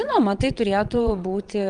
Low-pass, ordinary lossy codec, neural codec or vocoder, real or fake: 9.9 kHz; Opus, 32 kbps; vocoder, 22.05 kHz, 80 mel bands, WaveNeXt; fake